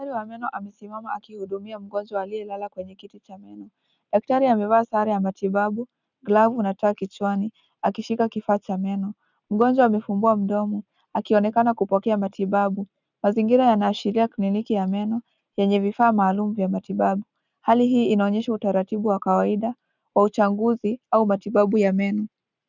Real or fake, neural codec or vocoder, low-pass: real; none; 7.2 kHz